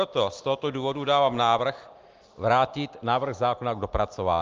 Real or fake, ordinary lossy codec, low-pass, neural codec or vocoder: real; Opus, 24 kbps; 7.2 kHz; none